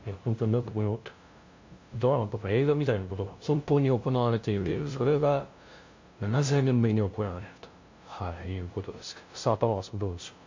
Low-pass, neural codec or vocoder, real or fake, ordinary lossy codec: 7.2 kHz; codec, 16 kHz, 0.5 kbps, FunCodec, trained on LibriTTS, 25 frames a second; fake; MP3, 48 kbps